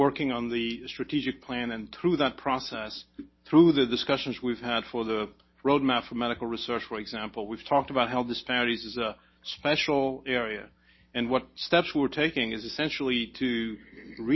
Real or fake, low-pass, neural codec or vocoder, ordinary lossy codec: real; 7.2 kHz; none; MP3, 24 kbps